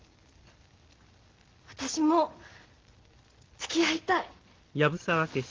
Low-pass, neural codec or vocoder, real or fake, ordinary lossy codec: 7.2 kHz; none; real; Opus, 16 kbps